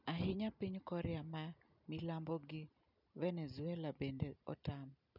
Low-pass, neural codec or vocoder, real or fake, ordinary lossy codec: 5.4 kHz; none; real; none